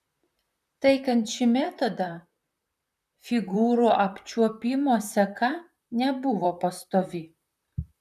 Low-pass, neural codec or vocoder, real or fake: 14.4 kHz; vocoder, 44.1 kHz, 128 mel bands, Pupu-Vocoder; fake